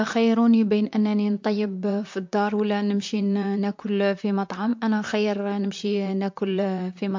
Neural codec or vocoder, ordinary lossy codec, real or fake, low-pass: vocoder, 44.1 kHz, 128 mel bands, Pupu-Vocoder; MP3, 48 kbps; fake; 7.2 kHz